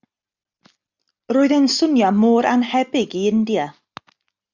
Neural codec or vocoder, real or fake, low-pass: none; real; 7.2 kHz